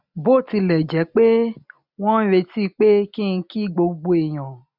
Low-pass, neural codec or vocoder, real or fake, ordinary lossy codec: 5.4 kHz; none; real; none